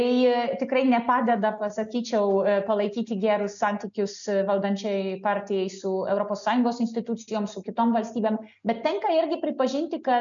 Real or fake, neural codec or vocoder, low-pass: real; none; 7.2 kHz